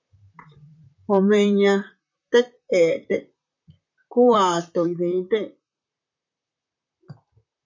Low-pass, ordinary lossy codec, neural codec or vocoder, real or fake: 7.2 kHz; MP3, 64 kbps; vocoder, 44.1 kHz, 128 mel bands, Pupu-Vocoder; fake